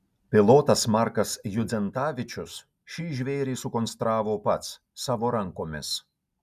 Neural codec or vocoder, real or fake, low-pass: none; real; 14.4 kHz